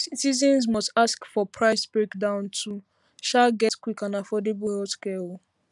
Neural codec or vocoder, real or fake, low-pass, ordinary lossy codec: none; real; 10.8 kHz; none